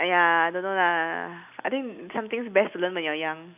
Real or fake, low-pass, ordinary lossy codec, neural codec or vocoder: real; 3.6 kHz; none; none